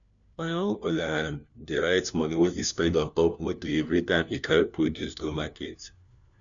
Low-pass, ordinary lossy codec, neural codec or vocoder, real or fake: 7.2 kHz; none; codec, 16 kHz, 1 kbps, FunCodec, trained on LibriTTS, 50 frames a second; fake